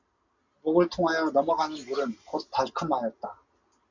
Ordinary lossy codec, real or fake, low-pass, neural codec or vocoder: MP3, 64 kbps; real; 7.2 kHz; none